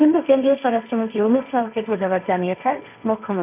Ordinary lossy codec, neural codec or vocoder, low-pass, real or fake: none; codec, 16 kHz, 1.1 kbps, Voila-Tokenizer; 3.6 kHz; fake